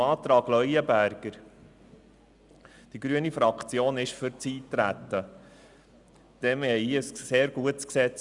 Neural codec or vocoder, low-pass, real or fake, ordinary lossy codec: none; 10.8 kHz; real; Opus, 64 kbps